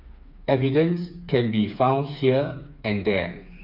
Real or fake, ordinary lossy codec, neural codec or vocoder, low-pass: fake; none; codec, 16 kHz, 4 kbps, FreqCodec, smaller model; 5.4 kHz